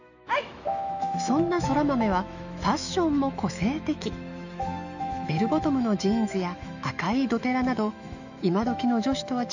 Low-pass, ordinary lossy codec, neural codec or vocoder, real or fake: 7.2 kHz; none; autoencoder, 48 kHz, 128 numbers a frame, DAC-VAE, trained on Japanese speech; fake